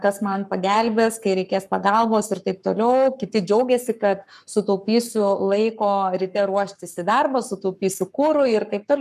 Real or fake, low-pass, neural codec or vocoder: fake; 14.4 kHz; codec, 44.1 kHz, 7.8 kbps, Pupu-Codec